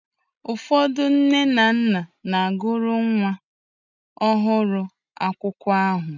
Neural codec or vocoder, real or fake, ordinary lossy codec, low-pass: none; real; none; 7.2 kHz